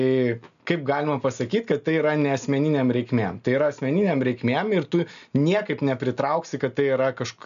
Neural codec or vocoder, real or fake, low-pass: none; real; 7.2 kHz